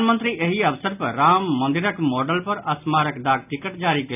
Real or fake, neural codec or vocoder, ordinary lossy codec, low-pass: real; none; none; 3.6 kHz